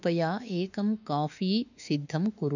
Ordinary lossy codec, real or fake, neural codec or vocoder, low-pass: none; fake; autoencoder, 48 kHz, 32 numbers a frame, DAC-VAE, trained on Japanese speech; 7.2 kHz